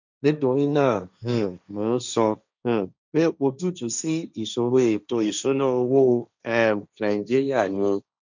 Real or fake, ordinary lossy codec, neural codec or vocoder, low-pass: fake; none; codec, 16 kHz, 1.1 kbps, Voila-Tokenizer; 7.2 kHz